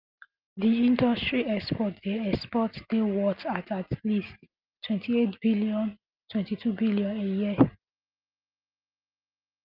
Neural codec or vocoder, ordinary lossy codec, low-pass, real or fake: none; Opus, 24 kbps; 5.4 kHz; real